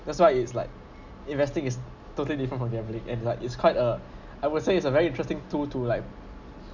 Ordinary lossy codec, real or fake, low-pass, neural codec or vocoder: none; real; 7.2 kHz; none